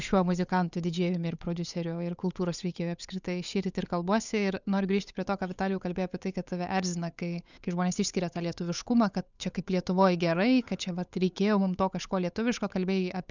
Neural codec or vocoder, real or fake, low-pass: codec, 16 kHz, 8 kbps, FunCodec, trained on Chinese and English, 25 frames a second; fake; 7.2 kHz